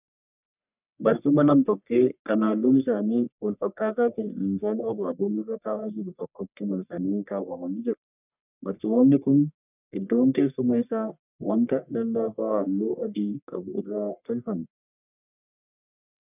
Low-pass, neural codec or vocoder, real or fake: 3.6 kHz; codec, 44.1 kHz, 1.7 kbps, Pupu-Codec; fake